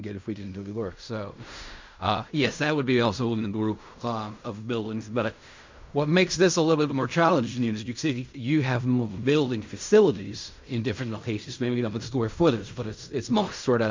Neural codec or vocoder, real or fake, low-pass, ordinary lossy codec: codec, 16 kHz in and 24 kHz out, 0.4 kbps, LongCat-Audio-Codec, fine tuned four codebook decoder; fake; 7.2 kHz; MP3, 64 kbps